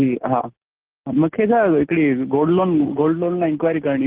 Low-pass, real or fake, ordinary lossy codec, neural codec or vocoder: 3.6 kHz; real; Opus, 16 kbps; none